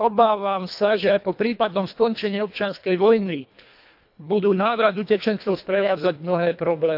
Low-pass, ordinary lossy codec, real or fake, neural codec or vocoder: 5.4 kHz; AAC, 48 kbps; fake; codec, 24 kHz, 1.5 kbps, HILCodec